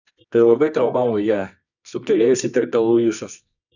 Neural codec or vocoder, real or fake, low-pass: codec, 24 kHz, 0.9 kbps, WavTokenizer, medium music audio release; fake; 7.2 kHz